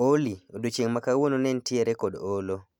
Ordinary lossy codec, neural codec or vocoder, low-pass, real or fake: none; none; 19.8 kHz; real